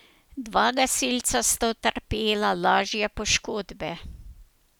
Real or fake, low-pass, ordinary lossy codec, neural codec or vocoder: real; none; none; none